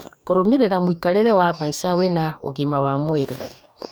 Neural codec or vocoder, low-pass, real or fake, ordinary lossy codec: codec, 44.1 kHz, 2.6 kbps, DAC; none; fake; none